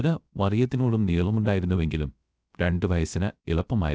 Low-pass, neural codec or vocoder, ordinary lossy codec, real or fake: none; codec, 16 kHz, 0.3 kbps, FocalCodec; none; fake